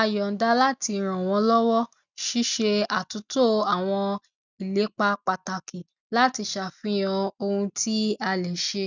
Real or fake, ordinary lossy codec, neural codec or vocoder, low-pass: real; none; none; 7.2 kHz